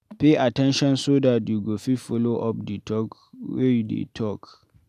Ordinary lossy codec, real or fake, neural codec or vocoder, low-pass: none; real; none; 14.4 kHz